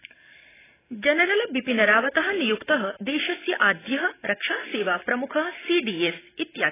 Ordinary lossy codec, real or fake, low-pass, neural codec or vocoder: AAC, 16 kbps; real; 3.6 kHz; none